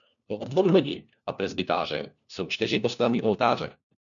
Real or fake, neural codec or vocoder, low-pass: fake; codec, 16 kHz, 1 kbps, FunCodec, trained on LibriTTS, 50 frames a second; 7.2 kHz